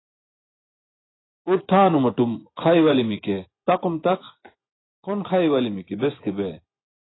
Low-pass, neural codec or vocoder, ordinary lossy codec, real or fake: 7.2 kHz; vocoder, 24 kHz, 100 mel bands, Vocos; AAC, 16 kbps; fake